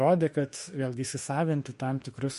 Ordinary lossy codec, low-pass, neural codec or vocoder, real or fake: MP3, 48 kbps; 14.4 kHz; autoencoder, 48 kHz, 32 numbers a frame, DAC-VAE, trained on Japanese speech; fake